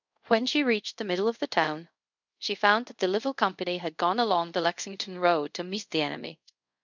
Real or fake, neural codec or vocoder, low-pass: fake; codec, 24 kHz, 0.5 kbps, DualCodec; 7.2 kHz